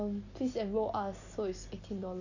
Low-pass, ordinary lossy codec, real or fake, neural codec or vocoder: 7.2 kHz; none; real; none